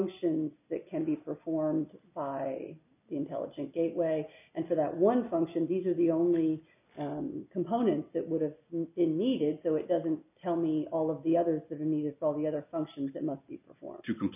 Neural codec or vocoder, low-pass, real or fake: none; 3.6 kHz; real